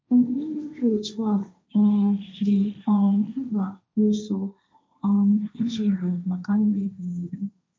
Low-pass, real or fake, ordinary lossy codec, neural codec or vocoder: none; fake; none; codec, 16 kHz, 1.1 kbps, Voila-Tokenizer